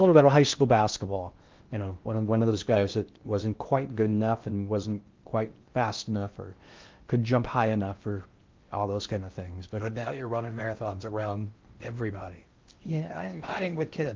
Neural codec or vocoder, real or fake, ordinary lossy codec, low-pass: codec, 16 kHz in and 24 kHz out, 0.6 kbps, FocalCodec, streaming, 4096 codes; fake; Opus, 32 kbps; 7.2 kHz